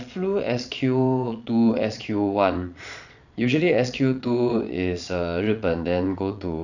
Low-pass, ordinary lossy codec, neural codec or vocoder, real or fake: 7.2 kHz; none; vocoder, 22.05 kHz, 80 mel bands, Vocos; fake